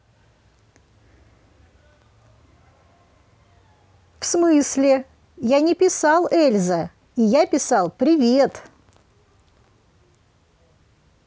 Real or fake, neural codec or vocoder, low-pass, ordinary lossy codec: real; none; none; none